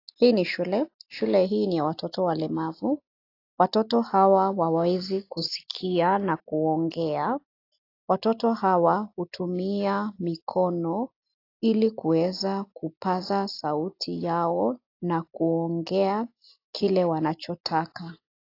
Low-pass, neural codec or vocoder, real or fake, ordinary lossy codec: 5.4 kHz; none; real; AAC, 32 kbps